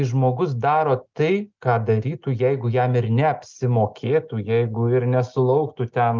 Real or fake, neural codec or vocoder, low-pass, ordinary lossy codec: real; none; 7.2 kHz; Opus, 32 kbps